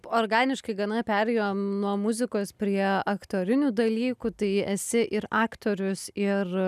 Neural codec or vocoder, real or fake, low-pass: none; real; 14.4 kHz